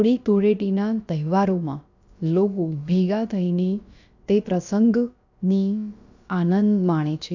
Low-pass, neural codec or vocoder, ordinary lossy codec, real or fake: 7.2 kHz; codec, 16 kHz, about 1 kbps, DyCAST, with the encoder's durations; none; fake